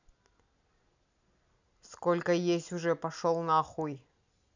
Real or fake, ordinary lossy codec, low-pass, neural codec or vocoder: real; none; 7.2 kHz; none